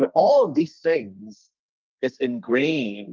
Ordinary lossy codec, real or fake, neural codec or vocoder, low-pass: Opus, 24 kbps; fake; codec, 32 kHz, 1.9 kbps, SNAC; 7.2 kHz